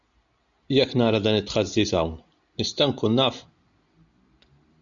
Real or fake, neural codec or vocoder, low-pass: real; none; 7.2 kHz